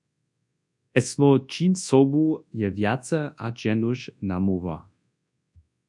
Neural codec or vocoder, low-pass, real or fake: codec, 24 kHz, 0.9 kbps, WavTokenizer, large speech release; 10.8 kHz; fake